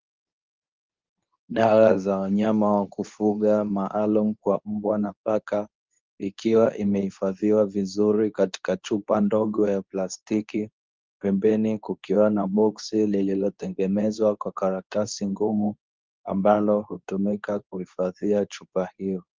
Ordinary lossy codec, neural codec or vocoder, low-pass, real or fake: Opus, 24 kbps; codec, 24 kHz, 0.9 kbps, WavTokenizer, medium speech release version 2; 7.2 kHz; fake